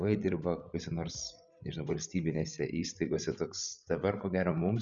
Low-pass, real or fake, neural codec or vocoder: 7.2 kHz; fake; codec, 16 kHz, 16 kbps, FreqCodec, larger model